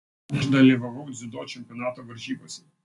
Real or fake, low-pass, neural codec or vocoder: real; 10.8 kHz; none